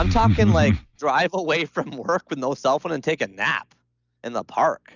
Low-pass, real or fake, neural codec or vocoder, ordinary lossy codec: 7.2 kHz; real; none; Opus, 64 kbps